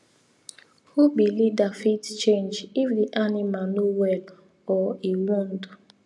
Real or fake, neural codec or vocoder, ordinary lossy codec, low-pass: real; none; none; none